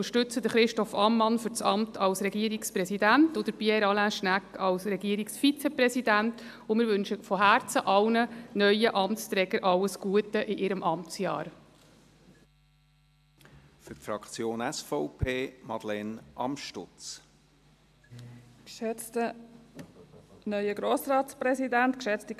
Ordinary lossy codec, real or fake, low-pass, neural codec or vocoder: AAC, 96 kbps; real; 14.4 kHz; none